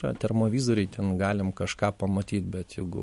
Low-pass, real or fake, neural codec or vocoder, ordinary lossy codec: 14.4 kHz; fake; vocoder, 44.1 kHz, 128 mel bands every 256 samples, BigVGAN v2; MP3, 48 kbps